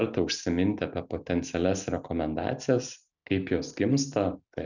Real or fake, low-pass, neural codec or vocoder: real; 7.2 kHz; none